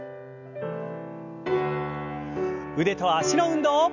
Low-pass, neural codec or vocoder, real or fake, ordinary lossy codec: 7.2 kHz; none; real; none